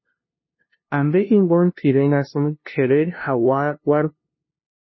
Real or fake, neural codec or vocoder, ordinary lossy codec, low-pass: fake; codec, 16 kHz, 0.5 kbps, FunCodec, trained on LibriTTS, 25 frames a second; MP3, 24 kbps; 7.2 kHz